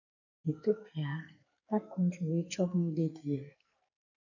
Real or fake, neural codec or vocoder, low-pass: fake; codec, 44.1 kHz, 2.6 kbps, SNAC; 7.2 kHz